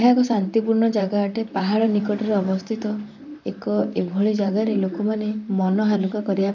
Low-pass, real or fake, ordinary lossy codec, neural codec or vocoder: 7.2 kHz; fake; none; vocoder, 44.1 kHz, 128 mel bands, Pupu-Vocoder